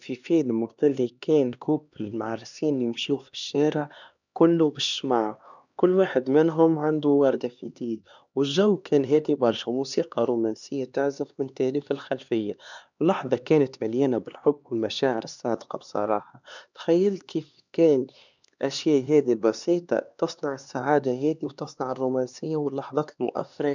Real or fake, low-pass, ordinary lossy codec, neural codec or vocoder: fake; 7.2 kHz; none; codec, 16 kHz, 2 kbps, X-Codec, HuBERT features, trained on LibriSpeech